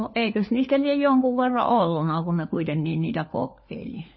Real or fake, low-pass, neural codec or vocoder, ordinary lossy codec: fake; 7.2 kHz; codec, 16 kHz, 4 kbps, FunCodec, trained on LibriTTS, 50 frames a second; MP3, 24 kbps